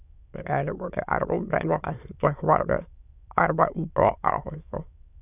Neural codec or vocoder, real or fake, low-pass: autoencoder, 22.05 kHz, a latent of 192 numbers a frame, VITS, trained on many speakers; fake; 3.6 kHz